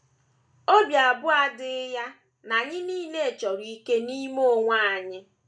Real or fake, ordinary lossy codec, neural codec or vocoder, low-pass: real; none; none; 9.9 kHz